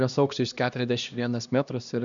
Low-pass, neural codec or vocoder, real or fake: 7.2 kHz; codec, 16 kHz, 1 kbps, X-Codec, HuBERT features, trained on LibriSpeech; fake